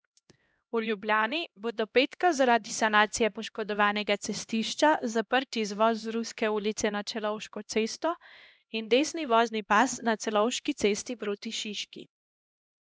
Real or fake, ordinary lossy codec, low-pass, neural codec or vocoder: fake; none; none; codec, 16 kHz, 1 kbps, X-Codec, HuBERT features, trained on LibriSpeech